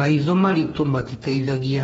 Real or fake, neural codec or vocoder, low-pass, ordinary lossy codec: fake; codec, 44.1 kHz, 2.6 kbps, DAC; 19.8 kHz; AAC, 24 kbps